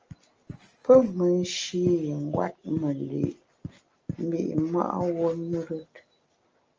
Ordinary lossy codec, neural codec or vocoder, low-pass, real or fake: Opus, 24 kbps; none; 7.2 kHz; real